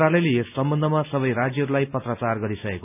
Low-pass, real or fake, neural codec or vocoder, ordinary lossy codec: 3.6 kHz; real; none; none